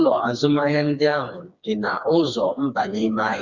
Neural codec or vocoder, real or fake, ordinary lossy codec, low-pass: codec, 16 kHz, 2 kbps, FreqCodec, smaller model; fake; Opus, 64 kbps; 7.2 kHz